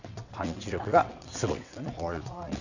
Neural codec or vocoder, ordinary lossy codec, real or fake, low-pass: vocoder, 22.05 kHz, 80 mel bands, WaveNeXt; none; fake; 7.2 kHz